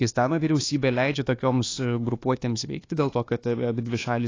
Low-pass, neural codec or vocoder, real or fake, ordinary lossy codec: 7.2 kHz; codec, 24 kHz, 1.2 kbps, DualCodec; fake; AAC, 32 kbps